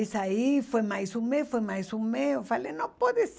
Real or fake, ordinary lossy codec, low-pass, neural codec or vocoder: real; none; none; none